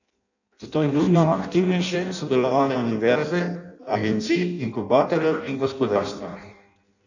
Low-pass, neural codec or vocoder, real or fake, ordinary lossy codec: 7.2 kHz; codec, 16 kHz in and 24 kHz out, 0.6 kbps, FireRedTTS-2 codec; fake; none